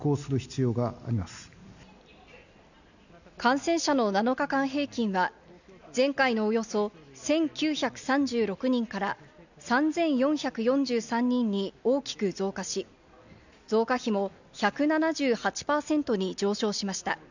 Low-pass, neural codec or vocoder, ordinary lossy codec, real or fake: 7.2 kHz; none; none; real